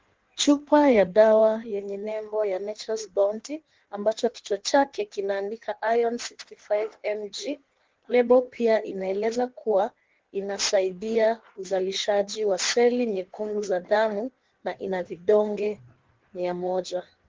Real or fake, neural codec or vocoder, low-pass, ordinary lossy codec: fake; codec, 16 kHz in and 24 kHz out, 1.1 kbps, FireRedTTS-2 codec; 7.2 kHz; Opus, 16 kbps